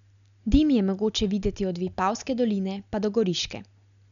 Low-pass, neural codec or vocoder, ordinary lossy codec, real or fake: 7.2 kHz; none; none; real